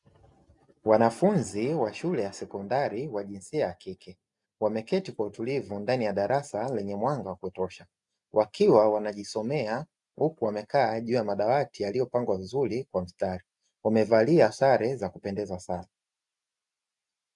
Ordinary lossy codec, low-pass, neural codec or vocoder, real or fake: MP3, 96 kbps; 10.8 kHz; none; real